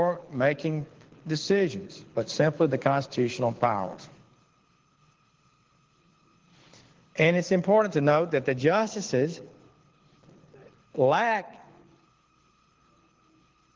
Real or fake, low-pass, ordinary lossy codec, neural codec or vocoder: fake; 7.2 kHz; Opus, 16 kbps; codec, 24 kHz, 6 kbps, HILCodec